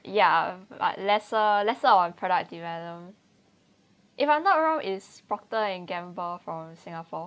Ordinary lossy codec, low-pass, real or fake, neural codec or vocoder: none; none; real; none